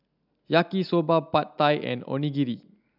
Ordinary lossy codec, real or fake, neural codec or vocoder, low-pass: none; real; none; 5.4 kHz